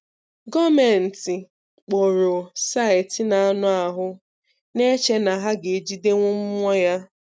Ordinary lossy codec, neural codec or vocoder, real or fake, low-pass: none; none; real; none